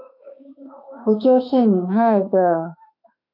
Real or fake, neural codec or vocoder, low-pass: fake; autoencoder, 48 kHz, 32 numbers a frame, DAC-VAE, trained on Japanese speech; 5.4 kHz